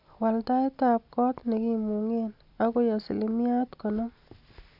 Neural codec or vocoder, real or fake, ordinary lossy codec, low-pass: none; real; AAC, 48 kbps; 5.4 kHz